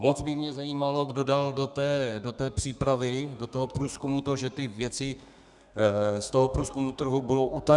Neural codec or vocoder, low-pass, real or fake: codec, 32 kHz, 1.9 kbps, SNAC; 10.8 kHz; fake